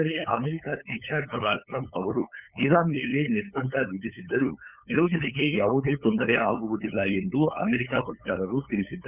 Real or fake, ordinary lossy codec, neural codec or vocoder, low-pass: fake; none; codec, 16 kHz, 8 kbps, FunCodec, trained on LibriTTS, 25 frames a second; 3.6 kHz